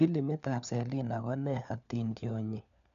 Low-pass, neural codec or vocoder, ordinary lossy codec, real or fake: 7.2 kHz; codec, 16 kHz, 4 kbps, FunCodec, trained on LibriTTS, 50 frames a second; none; fake